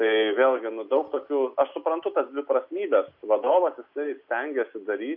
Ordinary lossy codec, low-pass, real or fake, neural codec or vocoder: AAC, 48 kbps; 5.4 kHz; real; none